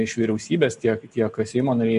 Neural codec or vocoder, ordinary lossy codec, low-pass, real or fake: none; MP3, 48 kbps; 14.4 kHz; real